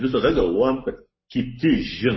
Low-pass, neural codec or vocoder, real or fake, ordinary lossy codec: 7.2 kHz; codec, 44.1 kHz, 7.8 kbps, Pupu-Codec; fake; MP3, 24 kbps